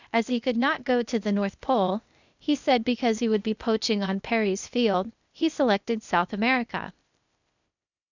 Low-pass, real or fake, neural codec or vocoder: 7.2 kHz; fake; codec, 16 kHz, 0.8 kbps, ZipCodec